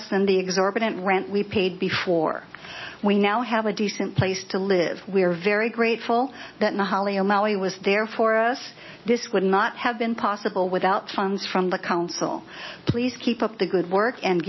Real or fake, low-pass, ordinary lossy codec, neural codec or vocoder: real; 7.2 kHz; MP3, 24 kbps; none